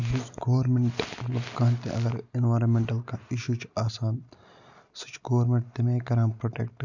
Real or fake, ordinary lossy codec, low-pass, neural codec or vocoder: real; none; 7.2 kHz; none